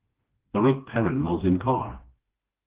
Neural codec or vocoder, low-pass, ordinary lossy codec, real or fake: codec, 16 kHz, 2 kbps, FreqCodec, smaller model; 3.6 kHz; Opus, 16 kbps; fake